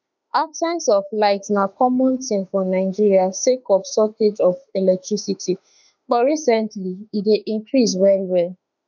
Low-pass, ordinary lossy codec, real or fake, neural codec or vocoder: 7.2 kHz; none; fake; autoencoder, 48 kHz, 32 numbers a frame, DAC-VAE, trained on Japanese speech